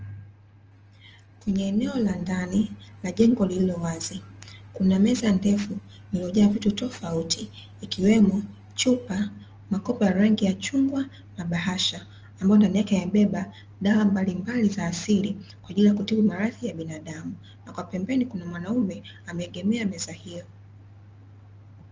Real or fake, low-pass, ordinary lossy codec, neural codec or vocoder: real; 7.2 kHz; Opus, 24 kbps; none